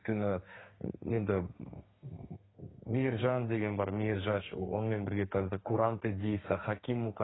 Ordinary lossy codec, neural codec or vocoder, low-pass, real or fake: AAC, 16 kbps; codec, 32 kHz, 1.9 kbps, SNAC; 7.2 kHz; fake